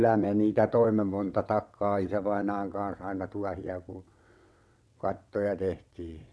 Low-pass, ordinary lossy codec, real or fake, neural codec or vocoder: none; none; fake; vocoder, 22.05 kHz, 80 mel bands, WaveNeXt